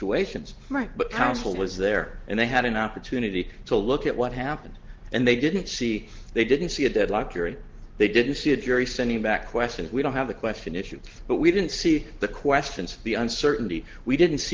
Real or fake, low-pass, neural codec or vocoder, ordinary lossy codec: real; 7.2 kHz; none; Opus, 16 kbps